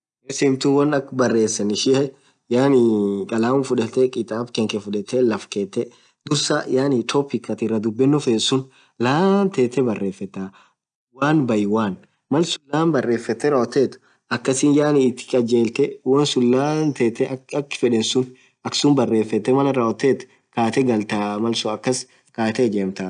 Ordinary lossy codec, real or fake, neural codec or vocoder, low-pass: none; real; none; 9.9 kHz